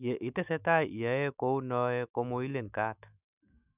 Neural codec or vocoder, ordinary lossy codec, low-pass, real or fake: autoencoder, 48 kHz, 128 numbers a frame, DAC-VAE, trained on Japanese speech; none; 3.6 kHz; fake